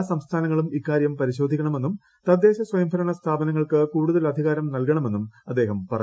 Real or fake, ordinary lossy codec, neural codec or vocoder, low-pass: real; none; none; none